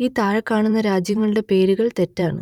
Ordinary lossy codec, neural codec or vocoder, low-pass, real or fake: none; vocoder, 44.1 kHz, 128 mel bands every 512 samples, BigVGAN v2; 19.8 kHz; fake